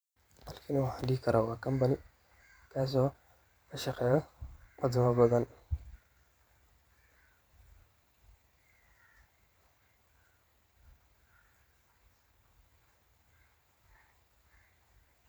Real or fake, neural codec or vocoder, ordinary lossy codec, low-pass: fake; vocoder, 44.1 kHz, 128 mel bands every 512 samples, BigVGAN v2; none; none